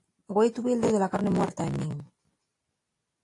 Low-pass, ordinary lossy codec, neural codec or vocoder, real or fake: 10.8 kHz; AAC, 48 kbps; none; real